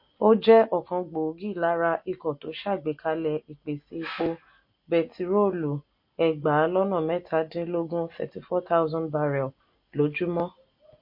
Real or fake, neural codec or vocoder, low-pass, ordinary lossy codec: real; none; 5.4 kHz; MP3, 32 kbps